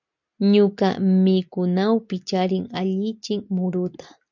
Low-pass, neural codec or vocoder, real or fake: 7.2 kHz; none; real